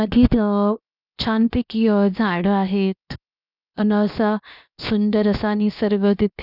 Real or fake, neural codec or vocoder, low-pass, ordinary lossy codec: fake; codec, 16 kHz, 0.7 kbps, FocalCodec; 5.4 kHz; none